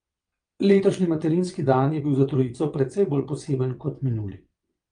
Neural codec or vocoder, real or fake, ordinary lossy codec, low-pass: vocoder, 22.05 kHz, 80 mel bands, WaveNeXt; fake; Opus, 32 kbps; 9.9 kHz